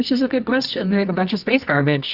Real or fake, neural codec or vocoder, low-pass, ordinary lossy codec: fake; codec, 24 kHz, 0.9 kbps, WavTokenizer, medium music audio release; 5.4 kHz; Opus, 64 kbps